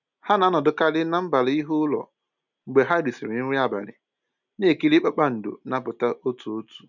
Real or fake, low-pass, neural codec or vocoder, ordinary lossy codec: real; 7.2 kHz; none; none